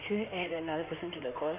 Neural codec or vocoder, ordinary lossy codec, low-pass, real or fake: codec, 16 kHz in and 24 kHz out, 2.2 kbps, FireRedTTS-2 codec; none; 3.6 kHz; fake